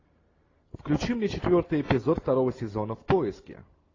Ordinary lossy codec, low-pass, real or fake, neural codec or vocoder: AAC, 32 kbps; 7.2 kHz; real; none